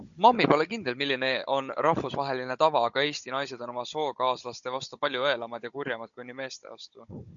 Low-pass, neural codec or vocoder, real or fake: 7.2 kHz; codec, 16 kHz, 16 kbps, FunCodec, trained on Chinese and English, 50 frames a second; fake